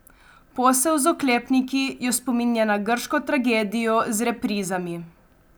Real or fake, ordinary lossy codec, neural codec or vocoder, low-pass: real; none; none; none